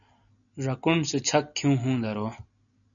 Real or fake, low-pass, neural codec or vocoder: real; 7.2 kHz; none